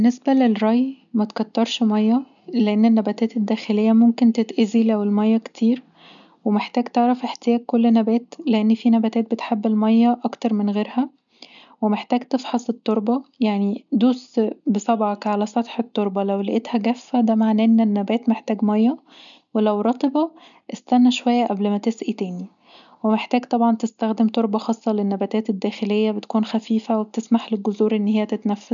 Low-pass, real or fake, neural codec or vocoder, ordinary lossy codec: 7.2 kHz; real; none; none